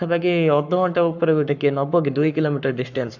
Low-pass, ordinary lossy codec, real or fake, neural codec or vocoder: 7.2 kHz; none; fake; codec, 44.1 kHz, 7.8 kbps, Pupu-Codec